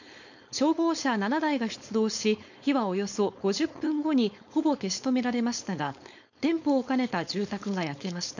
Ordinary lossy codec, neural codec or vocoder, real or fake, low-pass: none; codec, 16 kHz, 4.8 kbps, FACodec; fake; 7.2 kHz